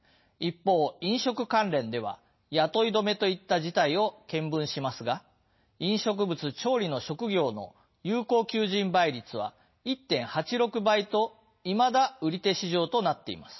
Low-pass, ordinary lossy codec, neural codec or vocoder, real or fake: 7.2 kHz; MP3, 24 kbps; none; real